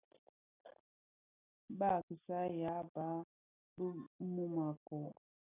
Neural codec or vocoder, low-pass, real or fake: none; 3.6 kHz; real